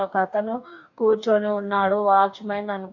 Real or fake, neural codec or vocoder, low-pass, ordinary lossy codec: fake; codec, 44.1 kHz, 2.6 kbps, DAC; 7.2 kHz; MP3, 48 kbps